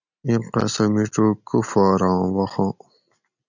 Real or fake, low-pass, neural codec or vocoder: real; 7.2 kHz; none